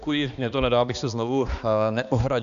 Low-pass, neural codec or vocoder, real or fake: 7.2 kHz; codec, 16 kHz, 2 kbps, X-Codec, HuBERT features, trained on balanced general audio; fake